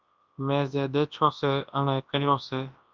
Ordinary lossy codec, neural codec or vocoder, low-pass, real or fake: Opus, 32 kbps; codec, 24 kHz, 0.9 kbps, WavTokenizer, large speech release; 7.2 kHz; fake